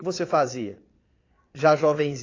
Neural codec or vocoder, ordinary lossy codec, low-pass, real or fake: vocoder, 22.05 kHz, 80 mel bands, Vocos; AAC, 32 kbps; 7.2 kHz; fake